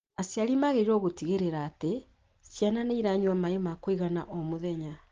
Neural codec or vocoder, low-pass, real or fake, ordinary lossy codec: none; 7.2 kHz; real; Opus, 16 kbps